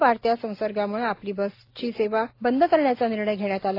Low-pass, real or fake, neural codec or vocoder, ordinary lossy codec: 5.4 kHz; real; none; AAC, 32 kbps